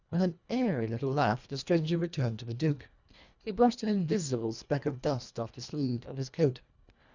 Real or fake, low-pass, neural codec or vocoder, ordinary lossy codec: fake; 7.2 kHz; codec, 24 kHz, 1.5 kbps, HILCodec; Opus, 64 kbps